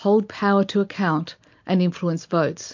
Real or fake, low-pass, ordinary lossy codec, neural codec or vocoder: real; 7.2 kHz; MP3, 48 kbps; none